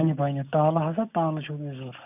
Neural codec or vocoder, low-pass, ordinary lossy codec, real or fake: none; 3.6 kHz; none; real